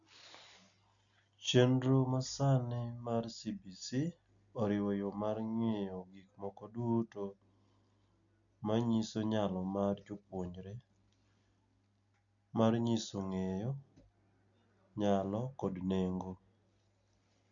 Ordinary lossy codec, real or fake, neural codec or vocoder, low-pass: none; real; none; 7.2 kHz